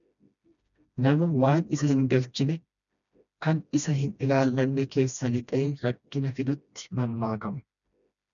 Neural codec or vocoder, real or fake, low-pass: codec, 16 kHz, 1 kbps, FreqCodec, smaller model; fake; 7.2 kHz